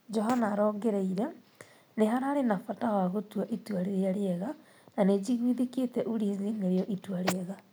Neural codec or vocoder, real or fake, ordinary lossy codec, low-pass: none; real; none; none